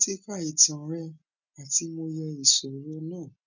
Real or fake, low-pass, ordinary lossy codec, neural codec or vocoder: real; 7.2 kHz; none; none